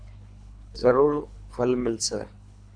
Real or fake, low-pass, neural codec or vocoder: fake; 9.9 kHz; codec, 24 kHz, 3 kbps, HILCodec